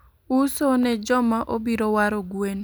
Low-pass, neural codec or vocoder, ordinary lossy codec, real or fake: none; none; none; real